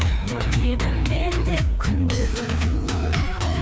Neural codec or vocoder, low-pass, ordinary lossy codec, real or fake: codec, 16 kHz, 2 kbps, FreqCodec, larger model; none; none; fake